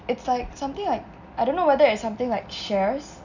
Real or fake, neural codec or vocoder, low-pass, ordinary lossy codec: real; none; 7.2 kHz; none